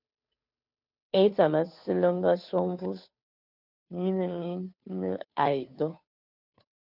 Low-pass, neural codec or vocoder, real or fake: 5.4 kHz; codec, 16 kHz, 2 kbps, FunCodec, trained on Chinese and English, 25 frames a second; fake